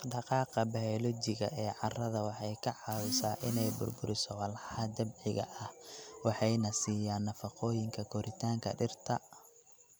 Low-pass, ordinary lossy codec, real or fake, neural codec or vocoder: none; none; real; none